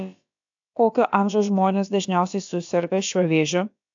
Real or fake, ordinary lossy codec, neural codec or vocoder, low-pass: fake; AAC, 64 kbps; codec, 16 kHz, about 1 kbps, DyCAST, with the encoder's durations; 7.2 kHz